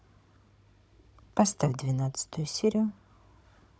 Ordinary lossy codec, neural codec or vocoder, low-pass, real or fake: none; codec, 16 kHz, 16 kbps, FunCodec, trained on Chinese and English, 50 frames a second; none; fake